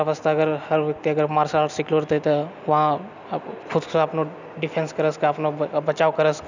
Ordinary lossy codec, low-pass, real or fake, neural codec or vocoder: none; 7.2 kHz; real; none